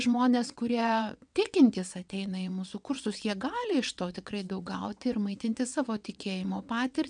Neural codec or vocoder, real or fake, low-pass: vocoder, 22.05 kHz, 80 mel bands, WaveNeXt; fake; 9.9 kHz